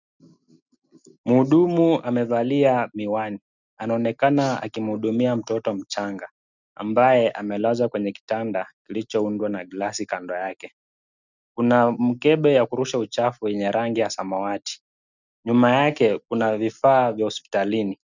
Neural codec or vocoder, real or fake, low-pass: none; real; 7.2 kHz